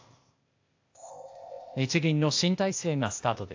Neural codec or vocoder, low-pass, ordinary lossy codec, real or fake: codec, 16 kHz, 0.8 kbps, ZipCodec; 7.2 kHz; AAC, 48 kbps; fake